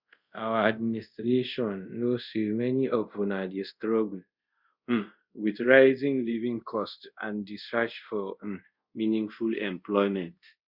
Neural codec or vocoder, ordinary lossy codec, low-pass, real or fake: codec, 24 kHz, 0.5 kbps, DualCodec; Opus, 64 kbps; 5.4 kHz; fake